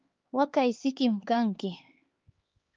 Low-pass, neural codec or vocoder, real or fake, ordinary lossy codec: 7.2 kHz; codec, 16 kHz, 4 kbps, X-Codec, HuBERT features, trained on LibriSpeech; fake; Opus, 32 kbps